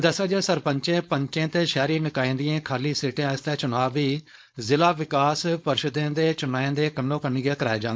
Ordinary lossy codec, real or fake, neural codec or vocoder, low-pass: none; fake; codec, 16 kHz, 4.8 kbps, FACodec; none